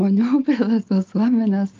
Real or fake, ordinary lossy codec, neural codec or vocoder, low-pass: real; Opus, 24 kbps; none; 7.2 kHz